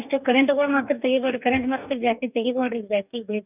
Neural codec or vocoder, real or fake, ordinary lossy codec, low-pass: codec, 44.1 kHz, 2.6 kbps, DAC; fake; none; 3.6 kHz